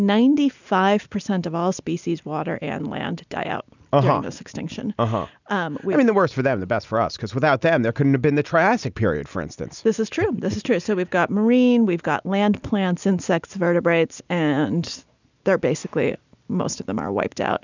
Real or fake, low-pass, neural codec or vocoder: real; 7.2 kHz; none